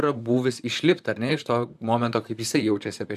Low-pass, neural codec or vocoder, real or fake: 14.4 kHz; vocoder, 44.1 kHz, 128 mel bands, Pupu-Vocoder; fake